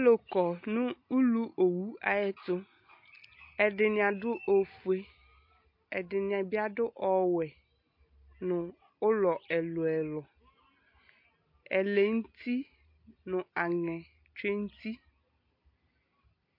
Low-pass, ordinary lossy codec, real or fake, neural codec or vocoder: 5.4 kHz; MP3, 32 kbps; real; none